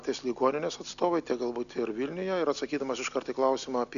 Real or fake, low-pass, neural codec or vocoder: real; 7.2 kHz; none